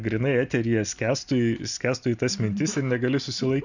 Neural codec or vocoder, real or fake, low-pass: none; real; 7.2 kHz